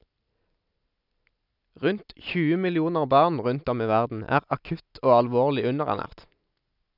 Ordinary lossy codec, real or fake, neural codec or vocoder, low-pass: none; real; none; 5.4 kHz